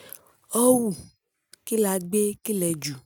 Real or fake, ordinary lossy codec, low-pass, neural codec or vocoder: real; none; none; none